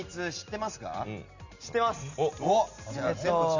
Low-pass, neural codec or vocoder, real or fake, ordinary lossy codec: 7.2 kHz; none; real; none